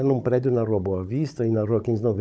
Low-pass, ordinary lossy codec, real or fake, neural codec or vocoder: none; none; fake; codec, 16 kHz, 16 kbps, FunCodec, trained on Chinese and English, 50 frames a second